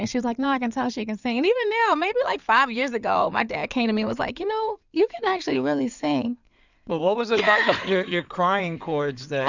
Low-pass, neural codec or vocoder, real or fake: 7.2 kHz; codec, 16 kHz in and 24 kHz out, 2.2 kbps, FireRedTTS-2 codec; fake